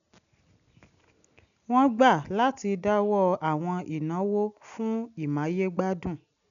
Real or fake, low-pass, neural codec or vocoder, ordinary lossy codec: real; 7.2 kHz; none; none